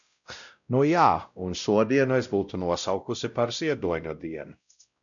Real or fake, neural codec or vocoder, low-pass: fake; codec, 16 kHz, 0.5 kbps, X-Codec, WavLM features, trained on Multilingual LibriSpeech; 7.2 kHz